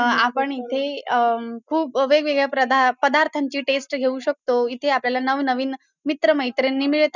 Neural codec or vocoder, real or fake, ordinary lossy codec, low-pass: none; real; none; 7.2 kHz